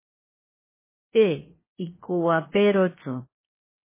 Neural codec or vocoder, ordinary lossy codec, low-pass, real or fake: codec, 16 kHz, 0.7 kbps, FocalCodec; MP3, 16 kbps; 3.6 kHz; fake